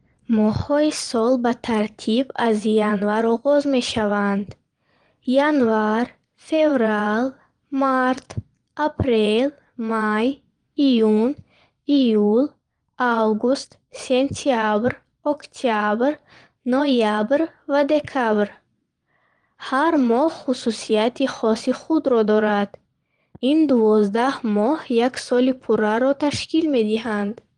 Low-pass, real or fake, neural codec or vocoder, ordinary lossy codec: 9.9 kHz; fake; vocoder, 22.05 kHz, 80 mel bands, Vocos; Opus, 24 kbps